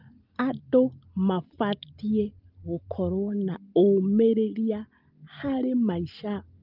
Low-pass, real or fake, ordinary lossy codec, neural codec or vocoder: 5.4 kHz; real; Opus, 32 kbps; none